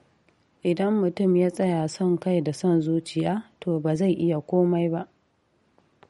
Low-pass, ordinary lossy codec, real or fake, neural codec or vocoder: 19.8 kHz; MP3, 48 kbps; real; none